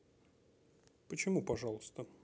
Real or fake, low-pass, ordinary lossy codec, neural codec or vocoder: real; none; none; none